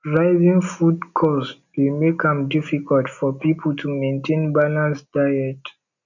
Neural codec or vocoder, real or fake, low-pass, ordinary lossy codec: none; real; 7.2 kHz; none